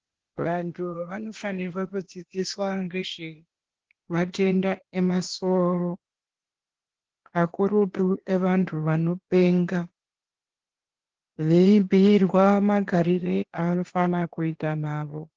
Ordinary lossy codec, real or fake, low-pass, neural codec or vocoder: Opus, 16 kbps; fake; 7.2 kHz; codec, 16 kHz, 0.8 kbps, ZipCodec